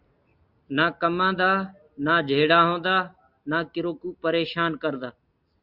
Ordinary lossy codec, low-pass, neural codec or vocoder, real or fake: Opus, 64 kbps; 5.4 kHz; none; real